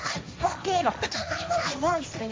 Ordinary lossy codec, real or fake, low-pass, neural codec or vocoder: none; fake; none; codec, 16 kHz, 1.1 kbps, Voila-Tokenizer